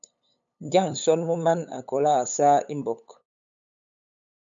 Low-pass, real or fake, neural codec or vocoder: 7.2 kHz; fake; codec, 16 kHz, 8 kbps, FunCodec, trained on LibriTTS, 25 frames a second